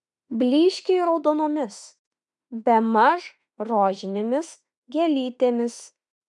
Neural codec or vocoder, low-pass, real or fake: autoencoder, 48 kHz, 32 numbers a frame, DAC-VAE, trained on Japanese speech; 10.8 kHz; fake